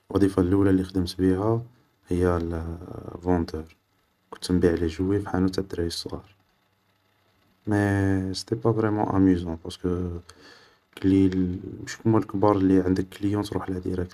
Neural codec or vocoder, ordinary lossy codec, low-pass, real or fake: none; none; 14.4 kHz; real